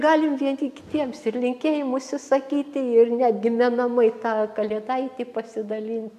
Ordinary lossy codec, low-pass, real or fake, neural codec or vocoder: AAC, 96 kbps; 14.4 kHz; real; none